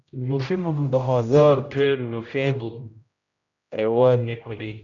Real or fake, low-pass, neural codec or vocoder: fake; 7.2 kHz; codec, 16 kHz, 0.5 kbps, X-Codec, HuBERT features, trained on general audio